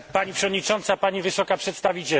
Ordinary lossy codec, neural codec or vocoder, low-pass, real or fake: none; none; none; real